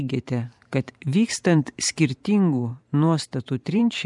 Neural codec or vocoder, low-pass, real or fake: vocoder, 44.1 kHz, 128 mel bands every 512 samples, BigVGAN v2; 10.8 kHz; fake